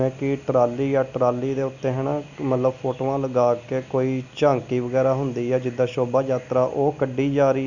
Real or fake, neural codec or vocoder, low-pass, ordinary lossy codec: real; none; 7.2 kHz; none